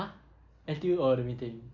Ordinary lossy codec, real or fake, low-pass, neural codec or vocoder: none; real; 7.2 kHz; none